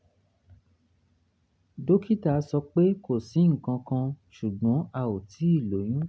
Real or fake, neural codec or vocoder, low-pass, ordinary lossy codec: real; none; none; none